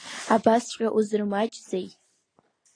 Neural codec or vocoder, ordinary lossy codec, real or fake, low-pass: none; AAC, 32 kbps; real; 9.9 kHz